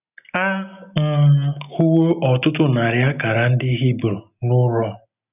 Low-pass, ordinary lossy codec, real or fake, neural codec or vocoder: 3.6 kHz; none; real; none